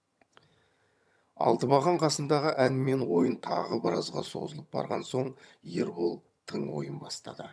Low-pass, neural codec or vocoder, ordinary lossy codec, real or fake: none; vocoder, 22.05 kHz, 80 mel bands, HiFi-GAN; none; fake